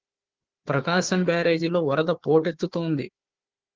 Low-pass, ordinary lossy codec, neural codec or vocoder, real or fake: 7.2 kHz; Opus, 16 kbps; codec, 16 kHz, 4 kbps, FunCodec, trained on Chinese and English, 50 frames a second; fake